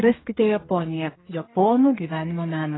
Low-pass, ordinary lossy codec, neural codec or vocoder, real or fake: 7.2 kHz; AAC, 16 kbps; codec, 32 kHz, 1.9 kbps, SNAC; fake